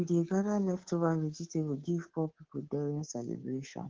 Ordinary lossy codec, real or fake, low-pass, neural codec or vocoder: Opus, 16 kbps; fake; 7.2 kHz; codec, 24 kHz, 3.1 kbps, DualCodec